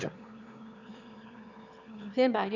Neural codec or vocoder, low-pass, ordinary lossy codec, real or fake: autoencoder, 22.05 kHz, a latent of 192 numbers a frame, VITS, trained on one speaker; 7.2 kHz; none; fake